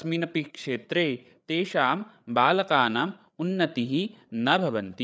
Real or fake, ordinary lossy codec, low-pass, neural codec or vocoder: fake; none; none; codec, 16 kHz, 8 kbps, FreqCodec, larger model